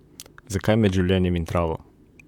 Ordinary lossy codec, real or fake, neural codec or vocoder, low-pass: MP3, 96 kbps; fake; vocoder, 44.1 kHz, 128 mel bands, Pupu-Vocoder; 19.8 kHz